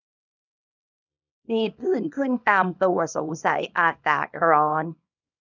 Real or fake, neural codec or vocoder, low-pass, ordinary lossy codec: fake; codec, 24 kHz, 0.9 kbps, WavTokenizer, small release; 7.2 kHz; none